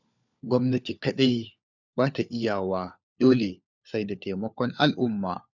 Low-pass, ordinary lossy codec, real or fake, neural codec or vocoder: 7.2 kHz; none; fake; codec, 16 kHz, 4 kbps, FunCodec, trained on LibriTTS, 50 frames a second